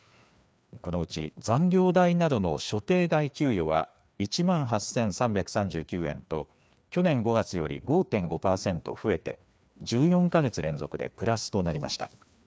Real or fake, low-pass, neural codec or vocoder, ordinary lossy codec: fake; none; codec, 16 kHz, 2 kbps, FreqCodec, larger model; none